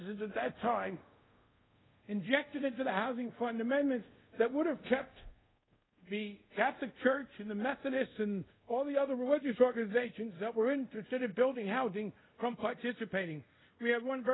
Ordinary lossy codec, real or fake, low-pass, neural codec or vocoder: AAC, 16 kbps; fake; 7.2 kHz; codec, 24 kHz, 0.5 kbps, DualCodec